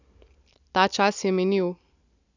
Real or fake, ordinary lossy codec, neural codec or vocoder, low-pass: real; none; none; 7.2 kHz